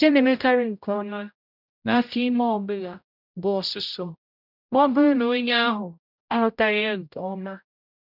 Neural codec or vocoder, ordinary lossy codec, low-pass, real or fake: codec, 16 kHz, 0.5 kbps, X-Codec, HuBERT features, trained on general audio; none; 5.4 kHz; fake